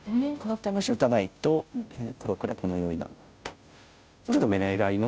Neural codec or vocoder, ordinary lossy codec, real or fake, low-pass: codec, 16 kHz, 0.5 kbps, FunCodec, trained on Chinese and English, 25 frames a second; none; fake; none